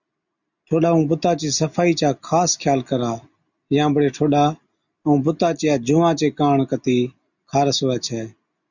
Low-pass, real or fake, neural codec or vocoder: 7.2 kHz; real; none